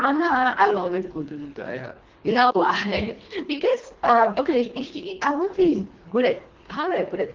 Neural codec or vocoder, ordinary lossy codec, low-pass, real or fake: codec, 24 kHz, 1.5 kbps, HILCodec; Opus, 16 kbps; 7.2 kHz; fake